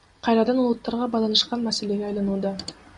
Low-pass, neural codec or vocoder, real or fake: 10.8 kHz; none; real